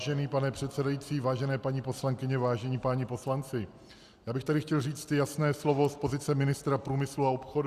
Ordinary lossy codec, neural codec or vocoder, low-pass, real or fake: Opus, 64 kbps; none; 14.4 kHz; real